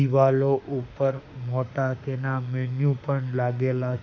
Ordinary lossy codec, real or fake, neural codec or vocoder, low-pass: MP3, 48 kbps; fake; autoencoder, 48 kHz, 32 numbers a frame, DAC-VAE, trained on Japanese speech; 7.2 kHz